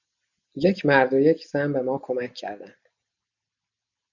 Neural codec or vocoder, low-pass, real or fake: none; 7.2 kHz; real